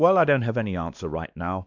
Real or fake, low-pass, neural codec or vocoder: fake; 7.2 kHz; codec, 16 kHz, 2 kbps, X-Codec, WavLM features, trained on Multilingual LibriSpeech